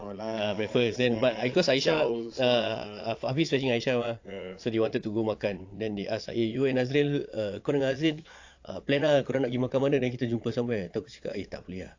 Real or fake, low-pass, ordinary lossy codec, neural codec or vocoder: fake; 7.2 kHz; AAC, 48 kbps; vocoder, 22.05 kHz, 80 mel bands, Vocos